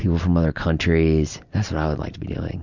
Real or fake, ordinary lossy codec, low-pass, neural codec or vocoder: real; Opus, 64 kbps; 7.2 kHz; none